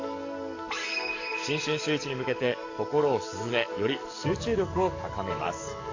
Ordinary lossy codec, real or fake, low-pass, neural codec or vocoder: AAC, 48 kbps; fake; 7.2 kHz; codec, 44.1 kHz, 7.8 kbps, DAC